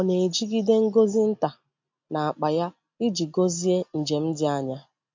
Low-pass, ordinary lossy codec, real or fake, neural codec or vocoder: 7.2 kHz; MP3, 48 kbps; real; none